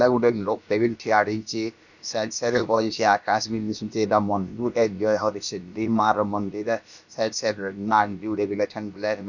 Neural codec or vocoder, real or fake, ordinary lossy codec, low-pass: codec, 16 kHz, about 1 kbps, DyCAST, with the encoder's durations; fake; none; 7.2 kHz